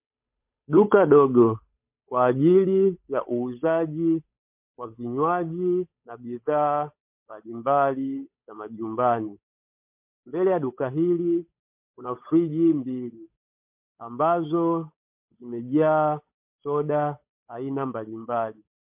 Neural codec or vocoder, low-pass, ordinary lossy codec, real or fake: codec, 16 kHz, 8 kbps, FunCodec, trained on Chinese and English, 25 frames a second; 3.6 kHz; MP3, 32 kbps; fake